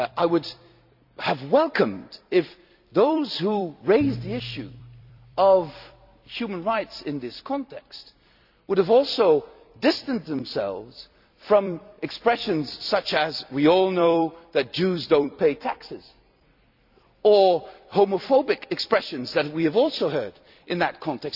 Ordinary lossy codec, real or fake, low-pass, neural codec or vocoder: none; fake; 5.4 kHz; vocoder, 44.1 kHz, 128 mel bands every 256 samples, BigVGAN v2